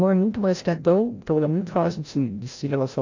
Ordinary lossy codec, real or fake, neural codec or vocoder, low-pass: AAC, 48 kbps; fake; codec, 16 kHz, 0.5 kbps, FreqCodec, larger model; 7.2 kHz